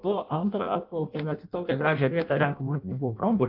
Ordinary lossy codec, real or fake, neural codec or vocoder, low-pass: Opus, 32 kbps; fake; codec, 16 kHz in and 24 kHz out, 0.6 kbps, FireRedTTS-2 codec; 5.4 kHz